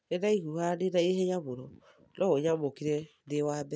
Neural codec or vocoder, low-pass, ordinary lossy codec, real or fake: none; none; none; real